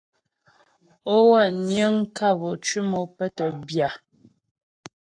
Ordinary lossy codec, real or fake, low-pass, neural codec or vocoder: AAC, 64 kbps; fake; 9.9 kHz; codec, 44.1 kHz, 7.8 kbps, Pupu-Codec